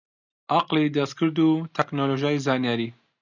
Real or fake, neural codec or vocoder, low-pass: real; none; 7.2 kHz